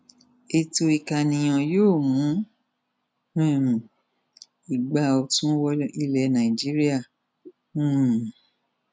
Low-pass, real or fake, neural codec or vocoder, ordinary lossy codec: none; real; none; none